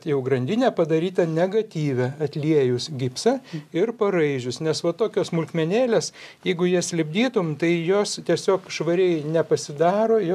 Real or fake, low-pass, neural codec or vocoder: real; 14.4 kHz; none